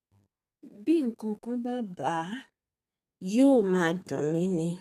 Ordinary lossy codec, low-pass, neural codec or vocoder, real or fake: none; 14.4 kHz; codec, 32 kHz, 1.9 kbps, SNAC; fake